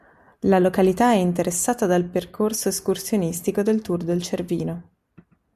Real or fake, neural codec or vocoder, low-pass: real; none; 14.4 kHz